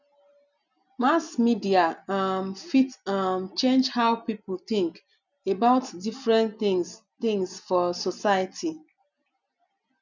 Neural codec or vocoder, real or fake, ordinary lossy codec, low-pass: none; real; none; 7.2 kHz